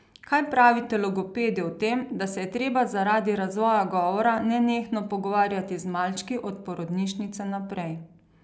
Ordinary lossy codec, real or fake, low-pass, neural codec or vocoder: none; real; none; none